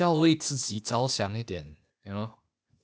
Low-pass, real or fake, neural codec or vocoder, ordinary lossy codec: none; fake; codec, 16 kHz, 0.8 kbps, ZipCodec; none